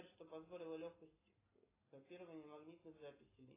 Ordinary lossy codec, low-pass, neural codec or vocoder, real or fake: AAC, 16 kbps; 3.6 kHz; none; real